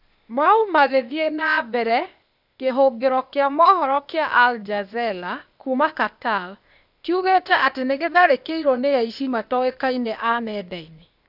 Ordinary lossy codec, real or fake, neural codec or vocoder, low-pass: none; fake; codec, 16 kHz, 0.8 kbps, ZipCodec; 5.4 kHz